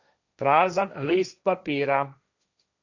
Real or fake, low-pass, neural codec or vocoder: fake; 7.2 kHz; codec, 16 kHz, 1.1 kbps, Voila-Tokenizer